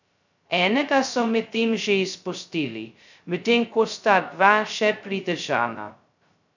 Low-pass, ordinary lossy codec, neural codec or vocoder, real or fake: 7.2 kHz; none; codec, 16 kHz, 0.2 kbps, FocalCodec; fake